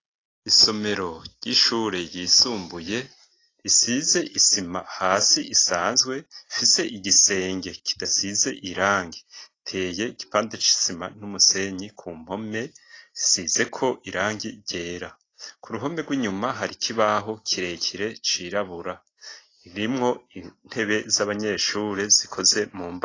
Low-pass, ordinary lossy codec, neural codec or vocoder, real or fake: 7.2 kHz; AAC, 32 kbps; none; real